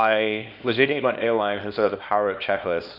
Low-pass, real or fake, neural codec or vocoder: 5.4 kHz; fake; codec, 24 kHz, 0.9 kbps, WavTokenizer, small release